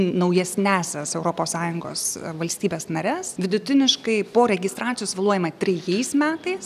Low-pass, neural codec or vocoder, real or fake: 14.4 kHz; none; real